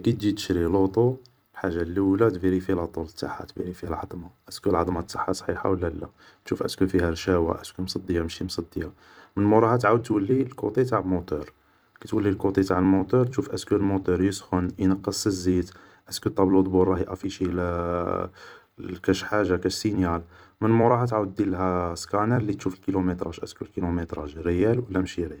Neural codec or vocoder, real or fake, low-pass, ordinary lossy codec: vocoder, 44.1 kHz, 128 mel bands every 256 samples, BigVGAN v2; fake; none; none